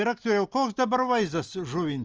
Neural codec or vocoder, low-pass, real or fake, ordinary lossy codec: none; 7.2 kHz; real; Opus, 24 kbps